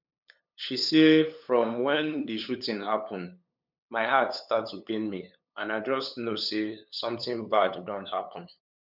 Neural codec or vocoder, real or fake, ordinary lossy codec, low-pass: codec, 16 kHz, 8 kbps, FunCodec, trained on LibriTTS, 25 frames a second; fake; none; 5.4 kHz